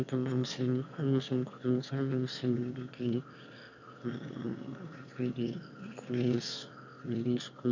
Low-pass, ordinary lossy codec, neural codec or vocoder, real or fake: 7.2 kHz; none; autoencoder, 22.05 kHz, a latent of 192 numbers a frame, VITS, trained on one speaker; fake